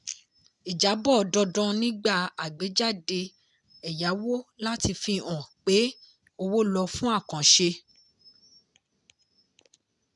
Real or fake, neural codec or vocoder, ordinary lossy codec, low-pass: real; none; none; 10.8 kHz